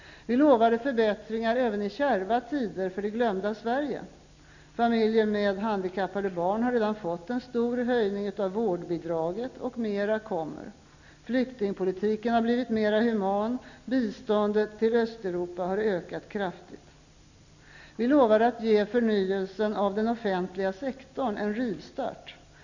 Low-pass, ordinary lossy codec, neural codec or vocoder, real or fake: 7.2 kHz; none; none; real